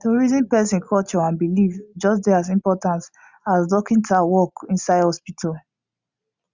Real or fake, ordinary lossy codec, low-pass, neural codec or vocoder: real; Opus, 64 kbps; 7.2 kHz; none